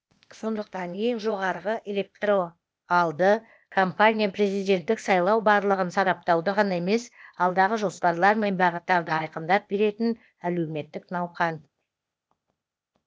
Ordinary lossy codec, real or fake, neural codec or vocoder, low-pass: none; fake; codec, 16 kHz, 0.8 kbps, ZipCodec; none